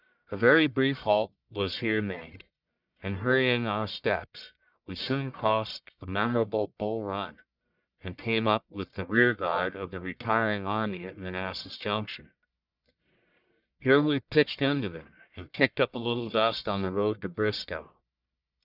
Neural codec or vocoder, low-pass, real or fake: codec, 44.1 kHz, 1.7 kbps, Pupu-Codec; 5.4 kHz; fake